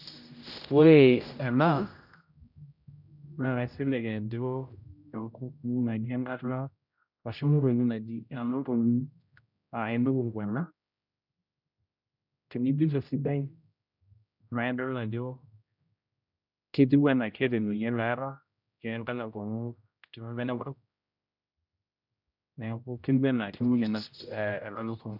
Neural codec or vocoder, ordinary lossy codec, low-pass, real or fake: codec, 16 kHz, 0.5 kbps, X-Codec, HuBERT features, trained on general audio; none; 5.4 kHz; fake